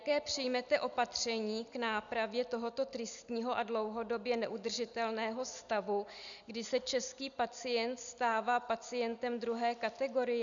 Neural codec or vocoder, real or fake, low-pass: none; real; 7.2 kHz